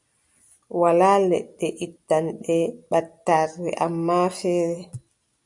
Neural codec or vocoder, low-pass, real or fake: none; 10.8 kHz; real